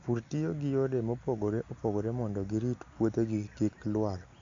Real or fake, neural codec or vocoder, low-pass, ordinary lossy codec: real; none; 7.2 kHz; MP3, 48 kbps